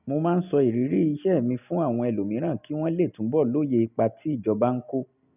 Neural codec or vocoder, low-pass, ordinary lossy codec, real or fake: none; 3.6 kHz; none; real